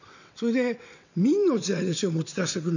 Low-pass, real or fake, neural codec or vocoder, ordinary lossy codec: 7.2 kHz; fake; vocoder, 22.05 kHz, 80 mel bands, WaveNeXt; none